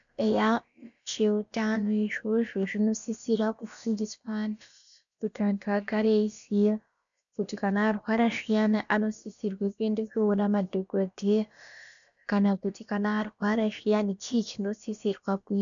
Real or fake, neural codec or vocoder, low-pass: fake; codec, 16 kHz, about 1 kbps, DyCAST, with the encoder's durations; 7.2 kHz